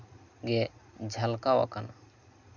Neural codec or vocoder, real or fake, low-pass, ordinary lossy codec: none; real; 7.2 kHz; none